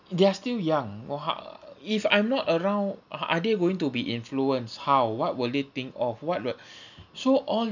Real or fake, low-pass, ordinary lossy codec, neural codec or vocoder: real; 7.2 kHz; none; none